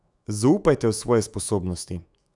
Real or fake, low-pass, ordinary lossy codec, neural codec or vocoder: fake; 10.8 kHz; none; autoencoder, 48 kHz, 128 numbers a frame, DAC-VAE, trained on Japanese speech